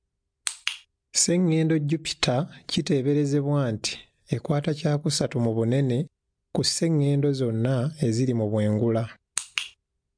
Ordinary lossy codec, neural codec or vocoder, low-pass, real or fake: MP3, 64 kbps; none; 9.9 kHz; real